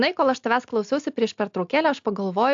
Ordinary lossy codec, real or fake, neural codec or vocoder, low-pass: AAC, 64 kbps; real; none; 7.2 kHz